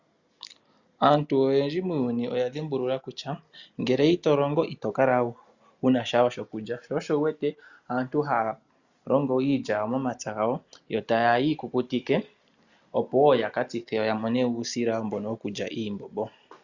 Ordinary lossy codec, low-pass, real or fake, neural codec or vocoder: Opus, 64 kbps; 7.2 kHz; real; none